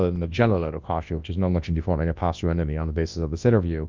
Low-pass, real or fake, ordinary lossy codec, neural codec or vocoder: 7.2 kHz; fake; Opus, 24 kbps; codec, 24 kHz, 0.9 kbps, WavTokenizer, large speech release